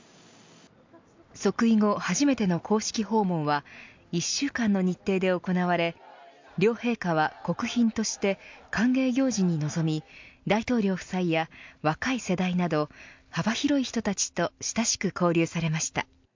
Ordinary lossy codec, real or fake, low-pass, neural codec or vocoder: MP3, 64 kbps; real; 7.2 kHz; none